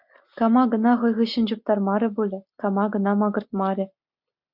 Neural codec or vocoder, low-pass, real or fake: none; 5.4 kHz; real